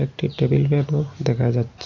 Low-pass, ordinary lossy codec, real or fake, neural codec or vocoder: 7.2 kHz; none; real; none